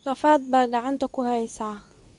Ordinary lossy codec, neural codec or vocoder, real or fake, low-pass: none; codec, 24 kHz, 0.9 kbps, WavTokenizer, medium speech release version 2; fake; 10.8 kHz